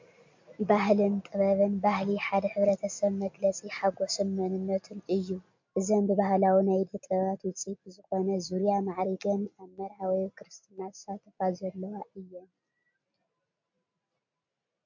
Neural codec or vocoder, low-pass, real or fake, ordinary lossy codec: none; 7.2 kHz; real; MP3, 48 kbps